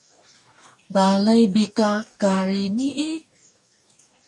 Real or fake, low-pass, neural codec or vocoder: fake; 10.8 kHz; codec, 44.1 kHz, 2.6 kbps, DAC